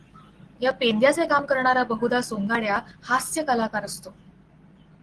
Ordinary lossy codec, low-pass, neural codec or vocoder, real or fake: Opus, 16 kbps; 10.8 kHz; none; real